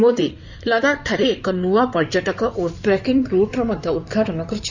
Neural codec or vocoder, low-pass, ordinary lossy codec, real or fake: codec, 16 kHz in and 24 kHz out, 2.2 kbps, FireRedTTS-2 codec; 7.2 kHz; none; fake